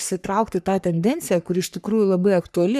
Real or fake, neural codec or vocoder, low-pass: fake; codec, 44.1 kHz, 3.4 kbps, Pupu-Codec; 14.4 kHz